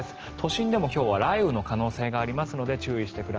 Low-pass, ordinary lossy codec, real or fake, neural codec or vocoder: 7.2 kHz; Opus, 16 kbps; real; none